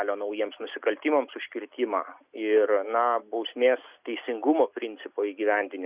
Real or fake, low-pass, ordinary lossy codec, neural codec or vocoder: real; 3.6 kHz; Opus, 64 kbps; none